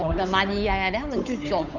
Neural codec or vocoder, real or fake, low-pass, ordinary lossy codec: codec, 16 kHz, 8 kbps, FunCodec, trained on Chinese and English, 25 frames a second; fake; 7.2 kHz; none